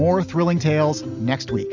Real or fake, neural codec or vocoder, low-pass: real; none; 7.2 kHz